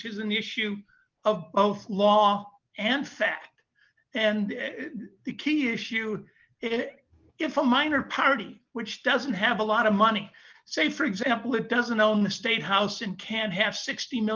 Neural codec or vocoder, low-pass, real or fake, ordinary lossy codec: none; 7.2 kHz; real; Opus, 24 kbps